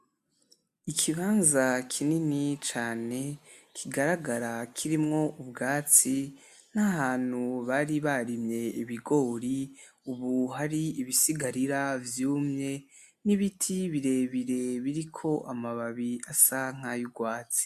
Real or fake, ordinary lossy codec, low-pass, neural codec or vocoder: real; AAC, 96 kbps; 14.4 kHz; none